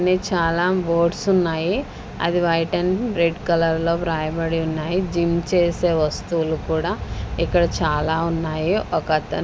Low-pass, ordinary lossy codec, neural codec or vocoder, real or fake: none; none; none; real